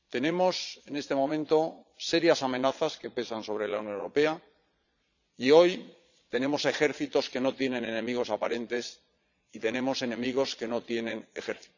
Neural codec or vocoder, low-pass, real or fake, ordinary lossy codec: vocoder, 22.05 kHz, 80 mel bands, Vocos; 7.2 kHz; fake; none